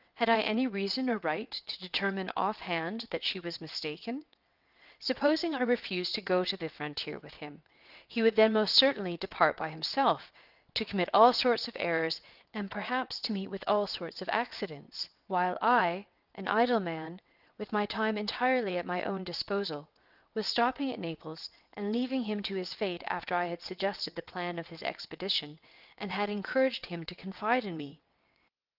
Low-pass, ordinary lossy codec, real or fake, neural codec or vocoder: 5.4 kHz; Opus, 24 kbps; fake; vocoder, 22.05 kHz, 80 mel bands, WaveNeXt